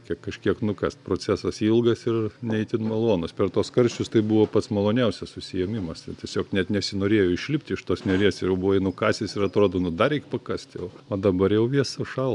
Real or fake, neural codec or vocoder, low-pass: real; none; 10.8 kHz